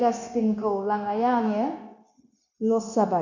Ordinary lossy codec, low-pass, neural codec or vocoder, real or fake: none; 7.2 kHz; codec, 16 kHz, 0.9 kbps, LongCat-Audio-Codec; fake